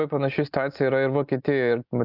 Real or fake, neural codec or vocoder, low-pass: real; none; 5.4 kHz